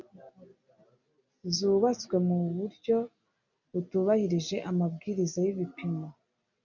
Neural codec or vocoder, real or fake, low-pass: none; real; 7.2 kHz